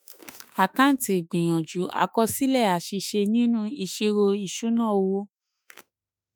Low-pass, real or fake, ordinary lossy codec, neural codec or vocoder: none; fake; none; autoencoder, 48 kHz, 32 numbers a frame, DAC-VAE, trained on Japanese speech